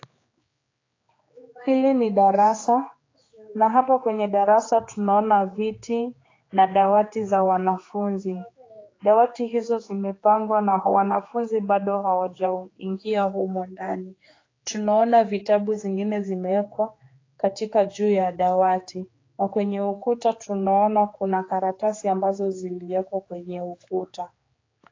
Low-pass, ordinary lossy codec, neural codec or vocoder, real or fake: 7.2 kHz; AAC, 32 kbps; codec, 16 kHz, 4 kbps, X-Codec, HuBERT features, trained on general audio; fake